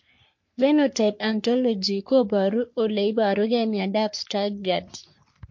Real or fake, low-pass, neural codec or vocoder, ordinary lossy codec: fake; 7.2 kHz; codec, 44.1 kHz, 3.4 kbps, Pupu-Codec; MP3, 48 kbps